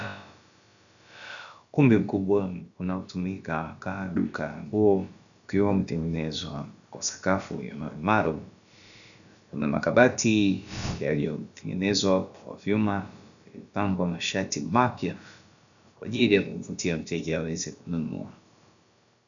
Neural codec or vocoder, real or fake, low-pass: codec, 16 kHz, about 1 kbps, DyCAST, with the encoder's durations; fake; 7.2 kHz